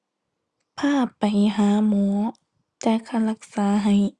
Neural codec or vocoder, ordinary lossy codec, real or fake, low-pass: none; Opus, 64 kbps; real; 10.8 kHz